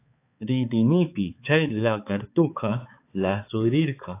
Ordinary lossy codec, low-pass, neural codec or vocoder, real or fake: AAC, 24 kbps; 3.6 kHz; codec, 16 kHz, 4 kbps, X-Codec, HuBERT features, trained on balanced general audio; fake